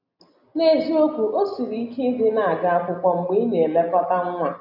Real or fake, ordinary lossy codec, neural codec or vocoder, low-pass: real; none; none; 5.4 kHz